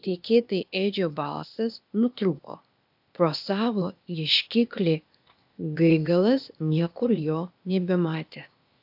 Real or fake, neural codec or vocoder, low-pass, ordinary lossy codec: fake; codec, 16 kHz, 0.8 kbps, ZipCodec; 5.4 kHz; AAC, 48 kbps